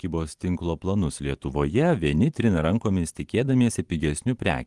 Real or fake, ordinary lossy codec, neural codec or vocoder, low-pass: fake; Opus, 24 kbps; vocoder, 44.1 kHz, 128 mel bands every 512 samples, BigVGAN v2; 10.8 kHz